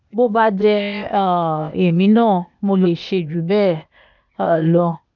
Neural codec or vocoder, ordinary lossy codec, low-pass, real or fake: codec, 16 kHz, 0.8 kbps, ZipCodec; none; 7.2 kHz; fake